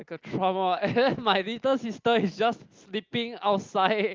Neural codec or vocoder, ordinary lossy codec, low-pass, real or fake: none; Opus, 32 kbps; 7.2 kHz; real